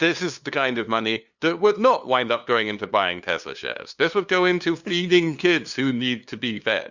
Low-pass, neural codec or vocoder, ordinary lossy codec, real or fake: 7.2 kHz; codec, 16 kHz, 2 kbps, FunCodec, trained on LibriTTS, 25 frames a second; Opus, 64 kbps; fake